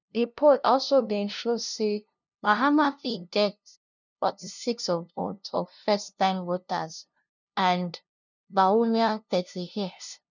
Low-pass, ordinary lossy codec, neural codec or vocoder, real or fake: 7.2 kHz; none; codec, 16 kHz, 0.5 kbps, FunCodec, trained on LibriTTS, 25 frames a second; fake